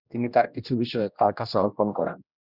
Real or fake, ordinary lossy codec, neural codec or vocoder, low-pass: fake; Opus, 64 kbps; codec, 16 kHz, 1 kbps, X-Codec, HuBERT features, trained on general audio; 5.4 kHz